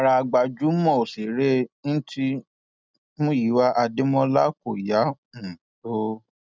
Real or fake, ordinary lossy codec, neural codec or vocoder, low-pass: real; none; none; none